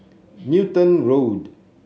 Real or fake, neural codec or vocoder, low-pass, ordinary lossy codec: real; none; none; none